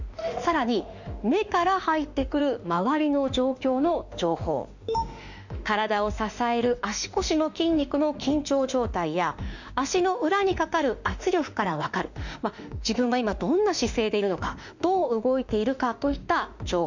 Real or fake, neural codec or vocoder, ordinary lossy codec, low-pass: fake; autoencoder, 48 kHz, 32 numbers a frame, DAC-VAE, trained on Japanese speech; none; 7.2 kHz